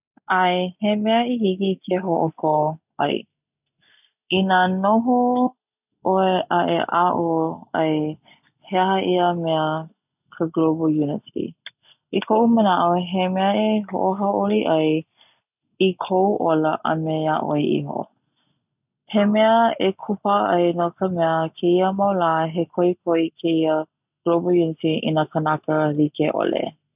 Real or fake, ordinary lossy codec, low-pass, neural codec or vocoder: real; none; 3.6 kHz; none